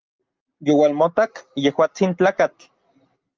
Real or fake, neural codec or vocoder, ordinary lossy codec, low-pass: real; none; Opus, 24 kbps; 7.2 kHz